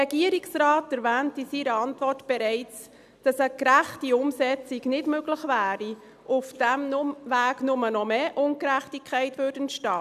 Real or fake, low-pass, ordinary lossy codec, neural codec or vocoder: real; 14.4 kHz; none; none